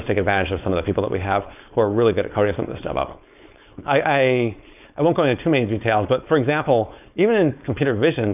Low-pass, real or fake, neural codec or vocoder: 3.6 kHz; fake; codec, 16 kHz, 4.8 kbps, FACodec